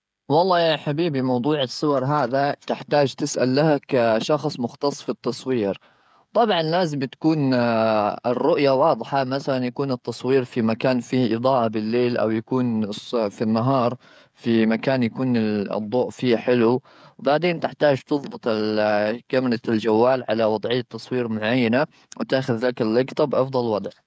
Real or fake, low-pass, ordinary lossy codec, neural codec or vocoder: fake; none; none; codec, 16 kHz, 16 kbps, FreqCodec, smaller model